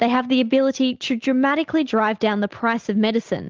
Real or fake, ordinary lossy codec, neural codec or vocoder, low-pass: real; Opus, 16 kbps; none; 7.2 kHz